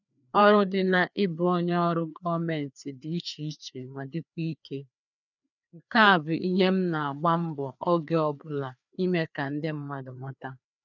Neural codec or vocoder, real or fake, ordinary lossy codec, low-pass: codec, 16 kHz, 2 kbps, FreqCodec, larger model; fake; none; 7.2 kHz